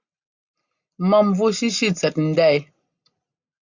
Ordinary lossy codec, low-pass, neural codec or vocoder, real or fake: Opus, 64 kbps; 7.2 kHz; none; real